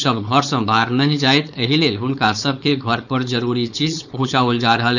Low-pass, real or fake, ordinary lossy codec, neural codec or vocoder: 7.2 kHz; fake; none; codec, 16 kHz, 4.8 kbps, FACodec